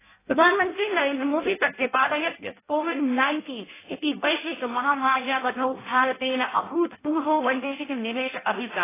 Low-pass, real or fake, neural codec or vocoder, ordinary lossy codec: 3.6 kHz; fake; codec, 16 kHz in and 24 kHz out, 0.6 kbps, FireRedTTS-2 codec; AAC, 16 kbps